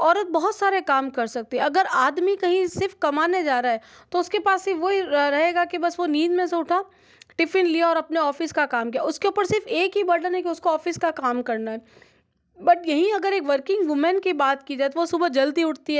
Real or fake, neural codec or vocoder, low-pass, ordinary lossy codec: real; none; none; none